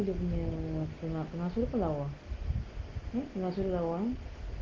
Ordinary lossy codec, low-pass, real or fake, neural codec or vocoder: Opus, 16 kbps; 7.2 kHz; real; none